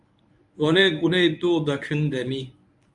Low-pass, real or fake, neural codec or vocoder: 10.8 kHz; fake; codec, 24 kHz, 0.9 kbps, WavTokenizer, medium speech release version 1